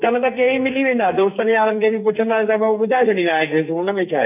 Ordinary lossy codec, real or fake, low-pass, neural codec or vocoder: none; fake; 3.6 kHz; codec, 44.1 kHz, 2.6 kbps, SNAC